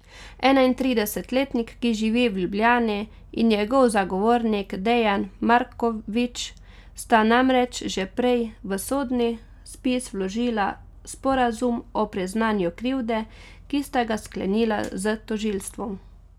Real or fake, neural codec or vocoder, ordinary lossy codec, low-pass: real; none; none; 19.8 kHz